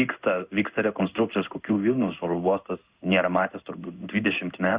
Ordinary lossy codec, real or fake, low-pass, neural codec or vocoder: Opus, 64 kbps; fake; 3.6 kHz; codec, 16 kHz in and 24 kHz out, 1 kbps, XY-Tokenizer